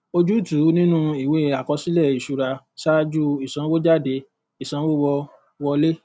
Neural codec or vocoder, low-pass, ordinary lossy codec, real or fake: none; none; none; real